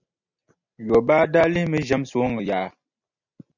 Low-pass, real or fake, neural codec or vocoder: 7.2 kHz; real; none